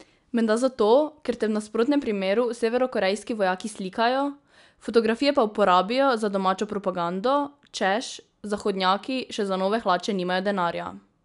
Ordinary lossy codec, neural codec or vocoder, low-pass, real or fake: none; none; 10.8 kHz; real